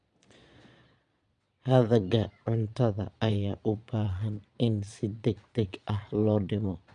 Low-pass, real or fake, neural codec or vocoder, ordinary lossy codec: 9.9 kHz; fake; vocoder, 22.05 kHz, 80 mel bands, Vocos; none